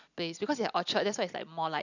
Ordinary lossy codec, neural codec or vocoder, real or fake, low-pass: none; none; real; 7.2 kHz